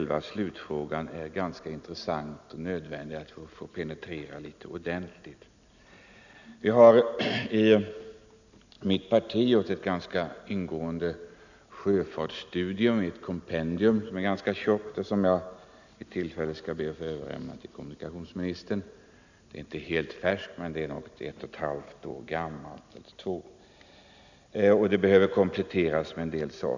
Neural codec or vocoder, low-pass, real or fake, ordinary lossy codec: none; 7.2 kHz; real; none